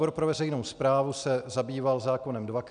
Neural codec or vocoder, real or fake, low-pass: none; real; 9.9 kHz